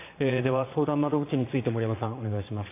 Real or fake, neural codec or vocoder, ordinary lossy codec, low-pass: fake; vocoder, 22.05 kHz, 80 mel bands, Vocos; AAC, 24 kbps; 3.6 kHz